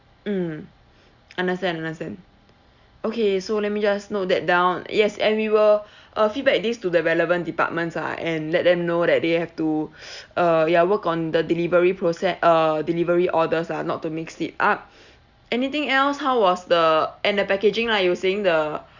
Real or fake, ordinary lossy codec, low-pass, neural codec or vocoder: real; Opus, 64 kbps; 7.2 kHz; none